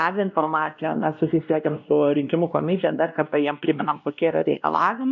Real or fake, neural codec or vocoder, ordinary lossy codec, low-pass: fake; codec, 16 kHz, 1 kbps, X-Codec, WavLM features, trained on Multilingual LibriSpeech; MP3, 96 kbps; 7.2 kHz